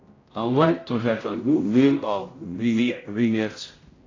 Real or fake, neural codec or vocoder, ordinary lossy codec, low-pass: fake; codec, 16 kHz, 0.5 kbps, X-Codec, HuBERT features, trained on general audio; AAC, 32 kbps; 7.2 kHz